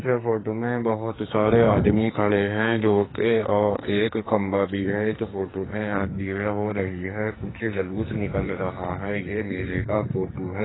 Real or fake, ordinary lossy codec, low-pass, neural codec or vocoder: fake; AAC, 16 kbps; 7.2 kHz; codec, 44.1 kHz, 2.6 kbps, SNAC